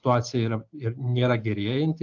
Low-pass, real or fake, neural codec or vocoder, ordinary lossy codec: 7.2 kHz; real; none; AAC, 48 kbps